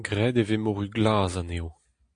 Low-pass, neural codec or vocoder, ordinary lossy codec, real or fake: 9.9 kHz; none; AAC, 64 kbps; real